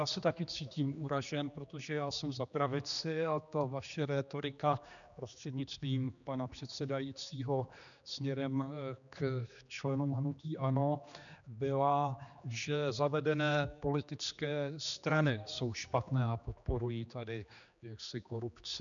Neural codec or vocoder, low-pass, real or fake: codec, 16 kHz, 2 kbps, X-Codec, HuBERT features, trained on general audio; 7.2 kHz; fake